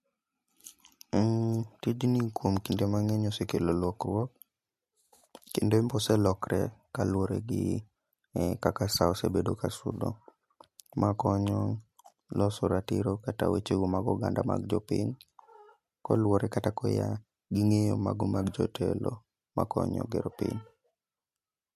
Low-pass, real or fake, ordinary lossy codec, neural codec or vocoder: 14.4 kHz; real; MP3, 64 kbps; none